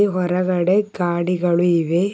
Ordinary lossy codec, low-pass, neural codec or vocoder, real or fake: none; none; none; real